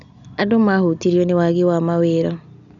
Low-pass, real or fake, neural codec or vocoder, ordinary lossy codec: 7.2 kHz; real; none; none